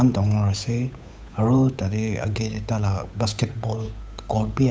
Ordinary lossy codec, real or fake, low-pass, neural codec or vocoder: none; fake; none; codec, 16 kHz, 8 kbps, FunCodec, trained on Chinese and English, 25 frames a second